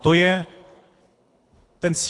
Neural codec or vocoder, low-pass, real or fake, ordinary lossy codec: codec, 24 kHz, 3 kbps, HILCodec; 10.8 kHz; fake; AAC, 48 kbps